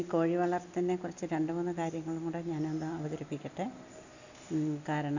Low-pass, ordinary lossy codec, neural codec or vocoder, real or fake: 7.2 kHz; none; none; real